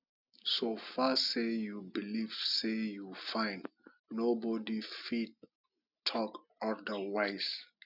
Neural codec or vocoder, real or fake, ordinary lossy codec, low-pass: none; real; none; 5.4 kHz